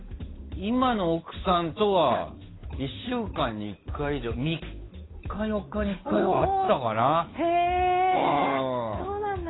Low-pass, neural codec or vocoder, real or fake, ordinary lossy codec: 7.2 kHz; codec, 16 kHz, 8 kbps, FunCodec, trained on Chinese and English, 25 frames a second; fake; AAC, 16 kbps